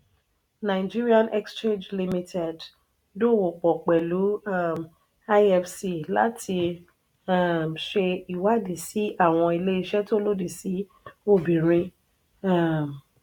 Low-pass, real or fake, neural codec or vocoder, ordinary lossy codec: 19.8 kHz; real; none; none